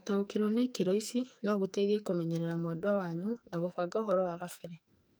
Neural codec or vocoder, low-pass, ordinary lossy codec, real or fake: codec, 44.1 kHz, 2.6 kbps, SNAC; none; none; fake